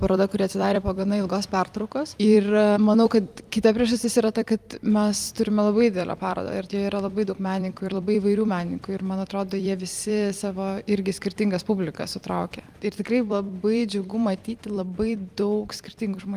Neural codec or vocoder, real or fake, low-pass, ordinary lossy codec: vocoder, 44.1 kHz, 128 mel bands every 256 samples, BigVGAN v2; fake; 14.4 kHz; Opus, 32 kbps